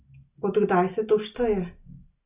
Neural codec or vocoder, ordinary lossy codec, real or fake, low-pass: none; Opus, 64 kbps; real; 3.6 kHz